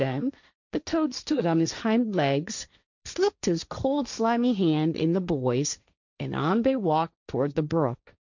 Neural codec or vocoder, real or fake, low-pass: codec, 16 kHz, 1.1 kbps, Voila-Tokenizer; fake; 7.2 kHz